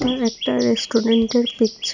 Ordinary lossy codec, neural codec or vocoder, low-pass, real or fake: none; none; 7.2 kHz; real